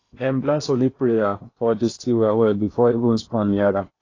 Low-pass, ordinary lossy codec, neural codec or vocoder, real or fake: 7.2 kHz; AAC, 32 kbps; codec, 16 kHz in and 24 kHz out, 0.8 kbps, FocalCodec, streaming, 65536 codes; fake